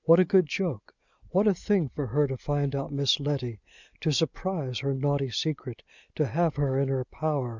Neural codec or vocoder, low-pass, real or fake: none; 7.2 kHz; real